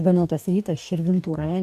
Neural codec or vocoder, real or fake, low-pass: codec, 44.1 kHz, 2.6 kbps, DAC; fake; 14.4 kHz